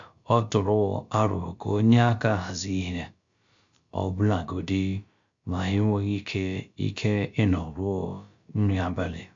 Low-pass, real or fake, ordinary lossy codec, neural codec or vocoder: 7.2 kHz; fake; MP3, 64 kbps; codec, 16 kHz, about 1 kbps, DyCAST, with the encoder's durations